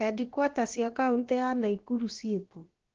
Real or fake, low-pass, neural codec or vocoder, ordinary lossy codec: fake; 7.2 kHz; codec, 16 kHz, about 1 kbps, DyCAST, with the encoder's durations; Opus, 16 kbps